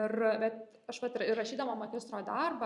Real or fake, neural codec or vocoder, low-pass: fake; vocoder, 44.1 kHz, 128 mel bands every 256 samples, BigVGAN v2; 10.8 kHz